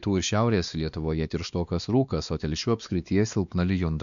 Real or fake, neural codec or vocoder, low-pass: fake; codec, 16 kHz, 2 kbps, X-Codec, WavLM features, trained on Multilingual LibriSpeech; 7.2 kHz